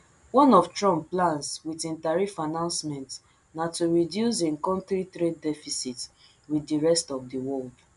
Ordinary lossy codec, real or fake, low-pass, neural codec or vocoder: none; real; 10.8 kHz; none